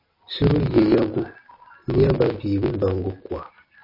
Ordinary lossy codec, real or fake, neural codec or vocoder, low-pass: MP3, 24 kbps; real; none; 5.4 kHz